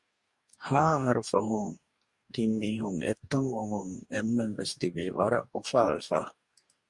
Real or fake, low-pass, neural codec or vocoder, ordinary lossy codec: fake; 10.8 kHz; codec, 44.1 kHz, 2.6 kbps, DAC; Opus, 64 kbps